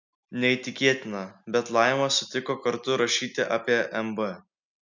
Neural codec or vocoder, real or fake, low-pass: none; real; 7.2 kHz